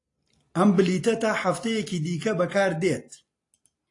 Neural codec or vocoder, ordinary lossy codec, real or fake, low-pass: none; AAC, 48 kbps; real; 10.8 kHz